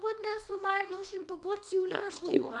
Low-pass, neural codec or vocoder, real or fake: 10.8 kHz; codec, 24 kHz, 0.9 kbps, WavTokenizer, small release; fake